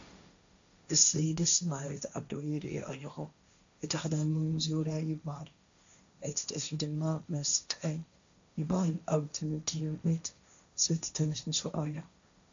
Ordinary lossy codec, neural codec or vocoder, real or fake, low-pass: AAC, 64 kbps; codec, 16 kHz, 1.1 kbps, Voila-Tokenizer; fake; 7.2 kHz